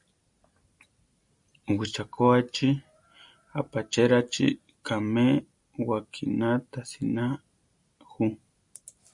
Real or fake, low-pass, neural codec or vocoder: real; 10.8 kHz; none